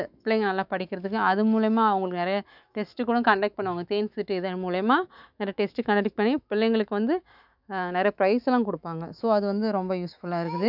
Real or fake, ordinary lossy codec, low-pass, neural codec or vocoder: fake; none; 5.4 kHz; autoencoder, 48 kHz, 128 numbers a frame, DAC-VAE, trained on Japanese speech